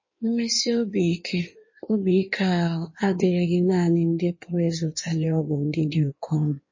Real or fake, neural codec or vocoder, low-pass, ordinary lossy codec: fake; codec, 16 kHz in and 24 kHz out, 1.1 kbps, FireRedTTS-2 codec; 7.2 kHz; MP3, 32 kbps